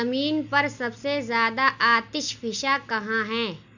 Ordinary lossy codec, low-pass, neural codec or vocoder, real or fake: none; 7.2 kHz; none; real